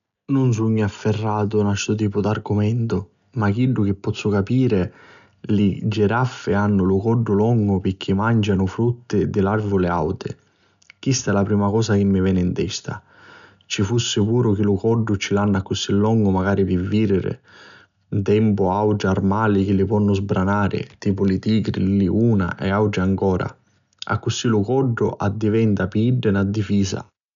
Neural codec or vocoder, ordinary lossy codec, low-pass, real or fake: none; none; 7.2 kHz; real